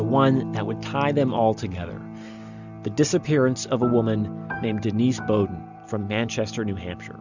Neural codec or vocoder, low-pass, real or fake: none; 7.2 kHz; real